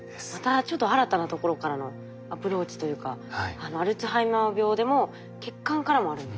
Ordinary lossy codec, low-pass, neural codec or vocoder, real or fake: none; none; none; real